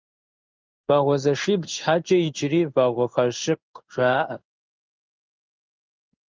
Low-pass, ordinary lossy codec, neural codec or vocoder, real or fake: 7.2 kHz; Opus, 32 kbps; codec, 16 kHz in and 24 kHz out, 1 kbps, XY-Tokenizer; fake